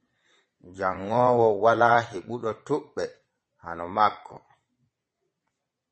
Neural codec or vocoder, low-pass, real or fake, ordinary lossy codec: vocoder, 22.05 kHz, 80 mel bands, Vocos; 9.9 kHz; fake; MP3, 32 kbps